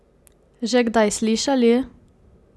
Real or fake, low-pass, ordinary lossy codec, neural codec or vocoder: real; none; none; none